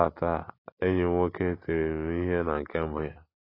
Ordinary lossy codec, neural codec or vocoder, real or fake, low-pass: AAC, 24 kbps; none; real; 5.4 kHz